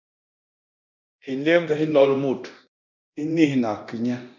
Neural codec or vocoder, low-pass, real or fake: codec, 24 kHz, 0.9 kbps, DualCodec; 7.2 kHz; fake